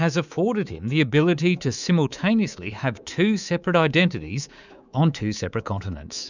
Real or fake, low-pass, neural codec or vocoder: fake; 7.2 kHz; codec, 24 kHz, 3.1 kbps, DualCodec